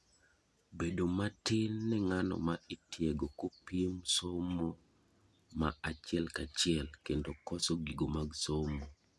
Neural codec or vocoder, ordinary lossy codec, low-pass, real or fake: none; none; none; real